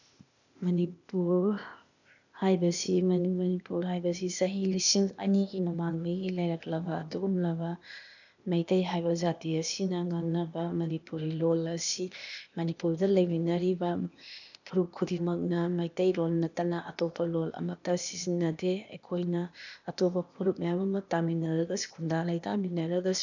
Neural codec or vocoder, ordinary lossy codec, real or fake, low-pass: codec, 16 kHz, 0.8 kbps, ZipCodec; none; fake; 7.2 kHz